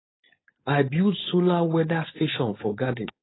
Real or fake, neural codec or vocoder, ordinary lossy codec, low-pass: fake; codec, 16 kHz, 4.8 kbps, FACodec; AAC, 16 kbps; 7.2 kHz